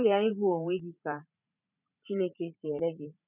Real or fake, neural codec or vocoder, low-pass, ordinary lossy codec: fake; vocoder, 44.1 kHz, 80 mel bands, Vocos; 3.6 kHz; none